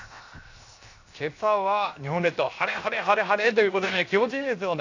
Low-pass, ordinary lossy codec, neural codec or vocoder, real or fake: 7.2 kHz; AAC, 48 kbps; codec, 16 kHz, 0.7 kbps, FocalCodec; fake